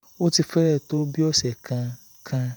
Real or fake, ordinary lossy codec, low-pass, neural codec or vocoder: fake; none; none; vocoder, 48 kHz, 128 mel bands, Vocos